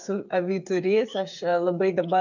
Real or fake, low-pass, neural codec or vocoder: fake; 7.2 kHz; codec, 16 kHz, 8 kbps, FreqCodec, smaller model